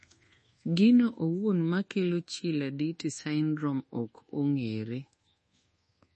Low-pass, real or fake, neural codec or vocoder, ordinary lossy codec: 10.8 kHz; fake; codec, 24 kHz, 1.2 kbps, DualCodec; MP3, 32 kbps